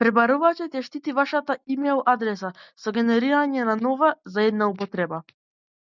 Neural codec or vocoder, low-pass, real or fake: none; 7.2 kHz; real